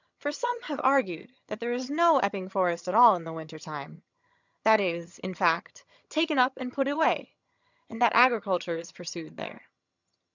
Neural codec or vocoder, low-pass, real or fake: vocoder, 22.05 kHz, 80 mel bands, HiFi-GAN; 7.2 kHz; fake